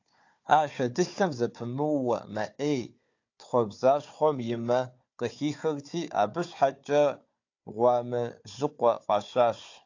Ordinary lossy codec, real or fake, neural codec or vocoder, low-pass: AAC, 48 kbps; fake; codec, 16 kHz, 4 kbps, FunCodec, trained on Chinese and English, 50 frames a second; 7.2 kHz